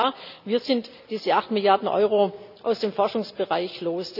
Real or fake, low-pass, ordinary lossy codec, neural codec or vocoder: real; 5.4 kHz; none; none